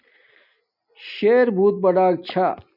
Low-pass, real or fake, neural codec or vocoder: 5.4 kHz; real; none